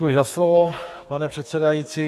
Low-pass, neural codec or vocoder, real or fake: 14.4 kHz; codec, 32 kHz, 1.9 kbps, SNAC; fake